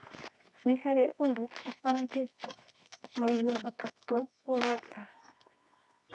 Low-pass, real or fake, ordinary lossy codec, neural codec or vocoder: 10.8 kHz; fake; none; codec, 24 kHz, 0.9 kbps, WavTokenizer, medium music audio release